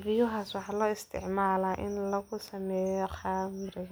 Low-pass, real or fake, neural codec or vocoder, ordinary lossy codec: none; real; none; none